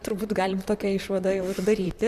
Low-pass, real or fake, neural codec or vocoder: 14.4 kHz; fake; vocoder, 44.1 kHz, 128 mel bands, Pupu-Vocoder